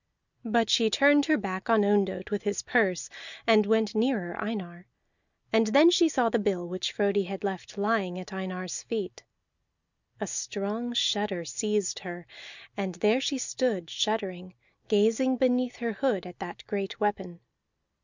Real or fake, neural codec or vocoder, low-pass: real; none; 7.2 kHz